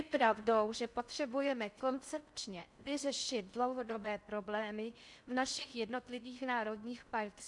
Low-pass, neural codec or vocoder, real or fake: 10.8 kHz; codec, 16 kHz in and 24 kHz out, 0.6 kbps, FocalCodec, streaming, 4096 codes; fake